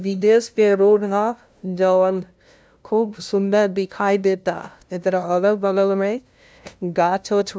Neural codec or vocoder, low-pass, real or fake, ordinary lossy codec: codec, 16 kHz, 0.5 kbps, FunCodec, trained on LibriTTS, 25 frames a second; none; fake; none